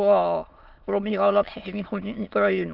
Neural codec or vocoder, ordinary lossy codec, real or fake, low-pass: autoencoder, 22.05 kHz, a latent of 192 numbers a frame, VITS, trained on many speakers; Opus, 32 kbps; fake; 5.4 kHz